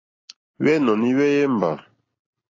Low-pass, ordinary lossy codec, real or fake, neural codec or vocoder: 7.2 kHz; AAC, 32 kbps; real; none